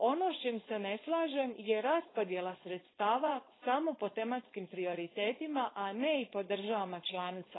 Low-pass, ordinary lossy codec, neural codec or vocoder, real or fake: 7.2 kHz; AAC, 16 kbps; codec, 16 kHz, 4.8 kbps, FACodec; fake